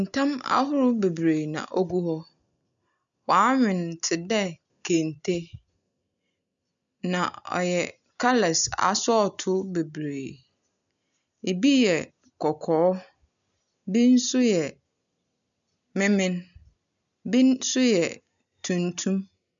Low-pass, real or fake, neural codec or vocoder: 7.2 kHz; real; none